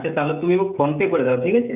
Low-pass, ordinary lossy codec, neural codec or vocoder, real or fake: 3.6 kHz; none; codec, 16 kHz, 16 kbps, FreqCodec, smaller model; fake